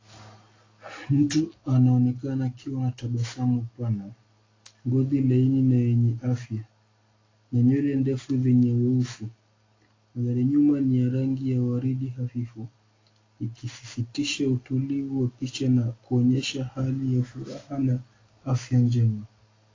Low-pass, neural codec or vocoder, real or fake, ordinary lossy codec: 7.2 kHz; none; real; AAC, 32 kbps